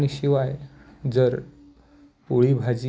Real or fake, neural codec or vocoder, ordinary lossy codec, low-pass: real; none; none; none